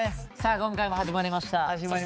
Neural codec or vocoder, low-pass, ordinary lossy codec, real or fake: codec, 16 kHz, 4 kbps, X-Codec, HuBERT features, trained on balanced general audio; none; none; fake